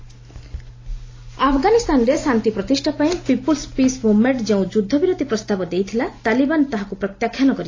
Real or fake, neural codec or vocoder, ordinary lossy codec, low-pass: real; none; AAC, 32 kbps; 7.2 kHz